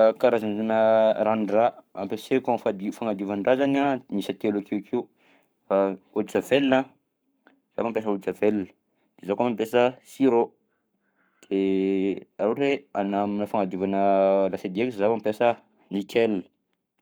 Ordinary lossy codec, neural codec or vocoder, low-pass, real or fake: none; codec, 44.1 kHz, 7.8 kbps, Pupu-Codec; none; fake